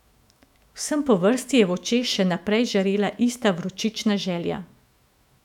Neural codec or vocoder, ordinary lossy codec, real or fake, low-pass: autoencoder, 48 kHz, 128 numbers a frame, DAC-VAE, trained on Japanese speech; none; fake; 19.8 kHz